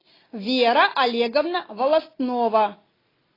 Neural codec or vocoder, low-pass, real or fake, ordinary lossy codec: none; 5.4 kHz; real; AAC, 24 kbps